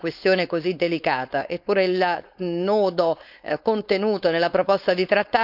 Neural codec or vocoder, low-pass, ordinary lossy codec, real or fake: codec, 16 kHz, 4.8 kbps, FACodec; 5.4 kHz; none; fake